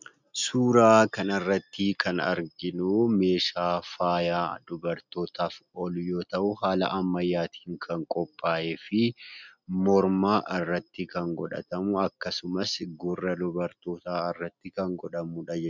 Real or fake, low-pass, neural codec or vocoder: real; 7.2 kHz; none